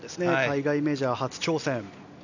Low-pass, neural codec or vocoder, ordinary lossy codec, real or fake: 7.2 kHz; none; none; real